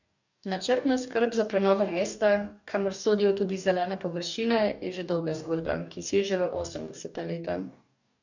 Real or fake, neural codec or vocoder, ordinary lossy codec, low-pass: fake; codec, 44.1 kHz, 2.6 kbps, DAC; none; 7.2 kHz